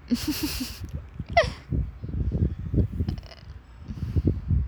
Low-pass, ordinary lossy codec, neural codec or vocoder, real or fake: none; none; none; real